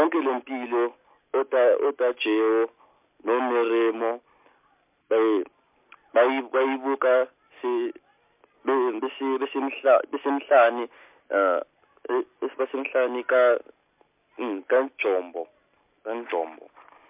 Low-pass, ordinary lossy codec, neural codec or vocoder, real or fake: 3.6 kHz; MP3, 32 kbps; none; real